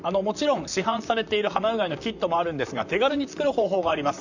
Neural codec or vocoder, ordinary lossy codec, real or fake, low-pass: vocoder, 44.1 kHz, 128 mel bands, Pupu-Vocoder; none; fake; 7.2 kHz